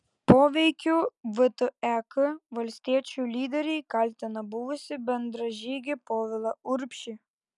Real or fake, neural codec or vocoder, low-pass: real; none; 10.8 kHz